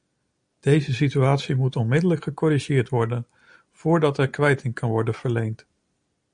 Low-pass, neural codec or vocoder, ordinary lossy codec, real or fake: 9.9 kHz; none; MP3, 48 kbps; real